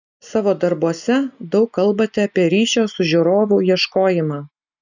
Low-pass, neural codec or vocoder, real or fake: 7.2 kHz; none; real